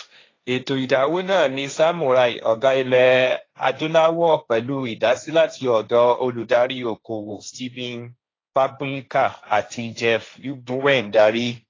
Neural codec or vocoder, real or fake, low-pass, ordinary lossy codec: codec, 16 kHz, 1.1 kbps, Voila-Tokenizer; fake; 7.2 kHz; AAC, 32 kbps